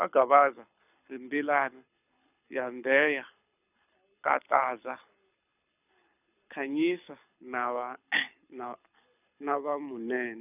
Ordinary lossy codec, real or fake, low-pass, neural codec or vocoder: none; fake; 3.6 kHz; codec, 24 kHz, 6 kbps, HILCodec